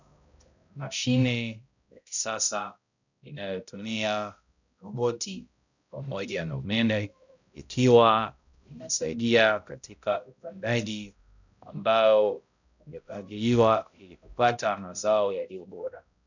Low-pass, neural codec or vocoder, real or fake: 7.2 kHz; codec, 16 kHz, 0.5 kbps, X-Codec, HuBERT features, trained on balanced general audio; fake